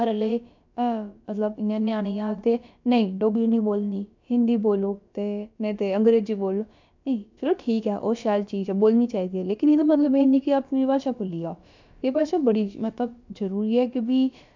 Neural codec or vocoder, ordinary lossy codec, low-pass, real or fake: codec, 16 kHz, about 1 kbps, DyCAST, with the encoder's durations; MP3, 64 kbps; 7.2 kHz; fake